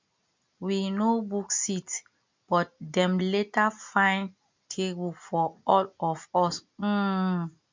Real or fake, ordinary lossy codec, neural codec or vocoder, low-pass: real; MP3, 64 kbps; none; 7.2 kHz